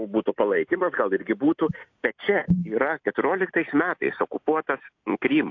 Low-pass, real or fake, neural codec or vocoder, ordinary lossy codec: 7.2 kHz; real; none; AAC, 48 kbps